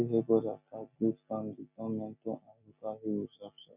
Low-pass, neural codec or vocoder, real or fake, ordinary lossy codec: 3.6 kHz; none; real; none